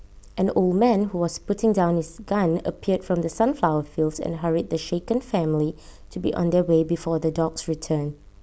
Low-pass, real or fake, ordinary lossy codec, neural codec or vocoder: none; real; none; none